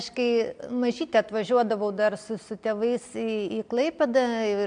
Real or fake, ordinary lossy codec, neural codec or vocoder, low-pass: real; MP3, 64 kbps; none; 9.9 kHz